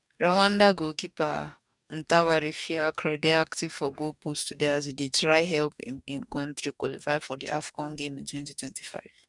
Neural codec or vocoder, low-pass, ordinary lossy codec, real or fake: codec, 44.1 kHz, 2.6 kbps, DAC; 10.8 kHz; none; fake